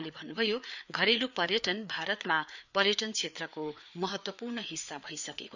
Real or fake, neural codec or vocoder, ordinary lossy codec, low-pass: fake; codec, 16 kHz, 4 kbps, FreqCodec, larger model; none; 7.2 kHz